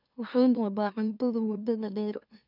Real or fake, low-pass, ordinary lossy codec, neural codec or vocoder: fake; 5.4 kHz; none; autoencoder, 44.1 kHz, a latent of 192 numbers a frame, MeloTTS